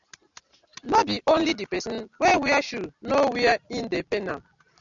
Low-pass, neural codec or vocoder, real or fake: 7.2 kHz; none; real